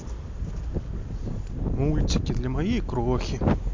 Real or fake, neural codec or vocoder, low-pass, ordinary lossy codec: real; none; 7.2 kHz; MP3, 48 kbps